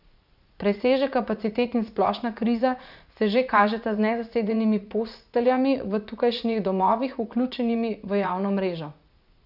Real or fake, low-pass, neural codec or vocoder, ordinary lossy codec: fake; 5.4 kHz; vocoder, 44.1 kHz, 80 mel bands, Vocos; none